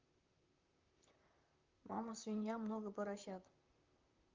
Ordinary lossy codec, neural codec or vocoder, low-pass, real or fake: Opus, 32 kbps; vocoder, 44.1 kHz, 128 mel bands, Pupu-Vocoder; 7.2 kHz; fake